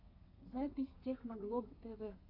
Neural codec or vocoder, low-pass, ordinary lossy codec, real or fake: codec, 32 kHz, 1.9 kbps, SNAC; 5.4 kHz; AAC, 48 kbps; fake